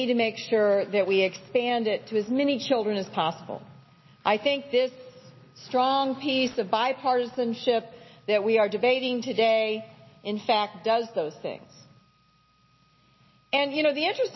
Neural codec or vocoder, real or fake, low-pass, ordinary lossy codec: none; real; 7.2 kHz; MP3, 24 kbps